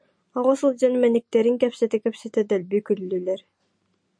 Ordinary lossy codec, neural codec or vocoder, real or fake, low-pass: MP3, 48 kbps; none; real; 9.9 kHz